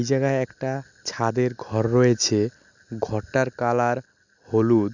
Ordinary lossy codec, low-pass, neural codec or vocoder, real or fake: Opus, 64 kbps; 7.2 kHz; none; real